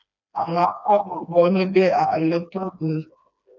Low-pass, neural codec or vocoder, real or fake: 7.2 kHz; codec, 16 kHz, 2 kbps, FreqCodec, smaller model; fake